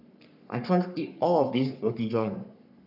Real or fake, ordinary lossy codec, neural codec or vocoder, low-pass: fake; none; codec, 44.1 kHz, 3.4 kbps, Pupu-Codec; 5.4 kHz